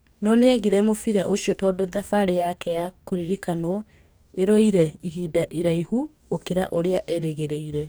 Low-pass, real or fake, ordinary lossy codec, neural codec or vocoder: none; fake; none; codec, 44.1 kHz, 2.6 kbps, DAC